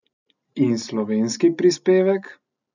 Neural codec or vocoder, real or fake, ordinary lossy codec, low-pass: none; real; none; 7.2 kHz